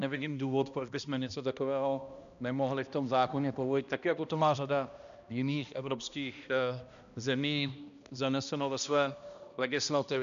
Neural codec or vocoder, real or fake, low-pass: codec, 16 kHz, 1 kbps, X-Codec, HuBERT features, trained on balanced general audio; fake; 7.2 kHz